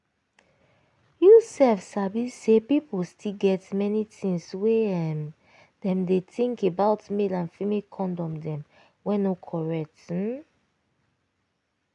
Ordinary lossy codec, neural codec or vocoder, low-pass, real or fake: none; none; 10.8 kHz; real